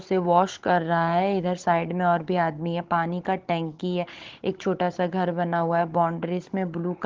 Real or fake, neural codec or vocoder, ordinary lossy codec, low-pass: fake; codec, 16 kHz, 16 kbps, FunCodec, trained on Chinese and English, 50 frames a second; Opus, 16 kbps; 7.2 kHz